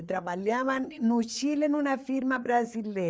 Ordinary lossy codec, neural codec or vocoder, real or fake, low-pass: none; codec, 16 kHz, 4 kbps, FreqCodec, larger model; fake; none